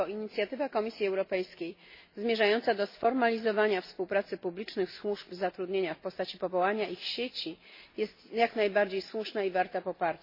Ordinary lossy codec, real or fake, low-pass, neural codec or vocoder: MP3, 24 kbps; real; 5.4 kHz; none